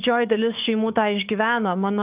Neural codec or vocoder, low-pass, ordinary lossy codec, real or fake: codec, 44.1 kHz, 7.8 kbps, DAC; 3.6 kHz; Opus, 24 kbps; fake